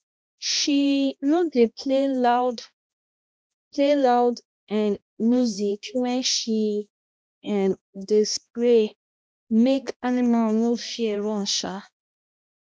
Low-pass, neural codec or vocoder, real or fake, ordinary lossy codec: none; codec, 16 kHz, 1 kbps, X-Codec, HuBERT features, trained on balanced general audio; fake; none